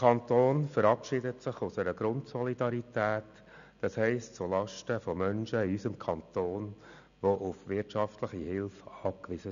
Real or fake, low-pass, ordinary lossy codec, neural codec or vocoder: real; 7.2 kHz; MP3, 96 kbps; none